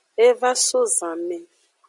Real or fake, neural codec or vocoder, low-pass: real; none; 10.8 kHz